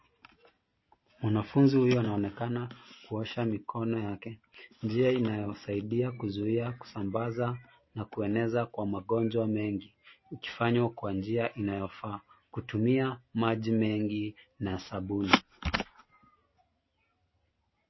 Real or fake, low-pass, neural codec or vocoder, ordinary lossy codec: real; 7.2 kHz; none; MP3, 24 kbps